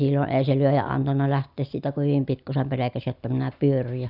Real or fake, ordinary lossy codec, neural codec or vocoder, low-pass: real; none; none; 5.4 kHz